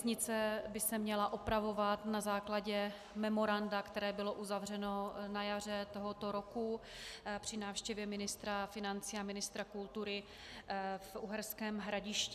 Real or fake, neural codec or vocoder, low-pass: real; none; 14.4 kHz